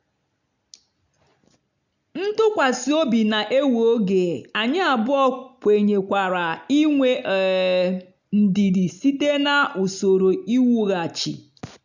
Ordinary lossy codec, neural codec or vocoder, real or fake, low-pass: none; none; real; 7.2 kHz